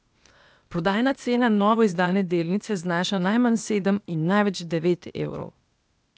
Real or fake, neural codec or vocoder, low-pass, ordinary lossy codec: fake; codec, 16 kHz, 0.8 kbps, ZipCodec; none; none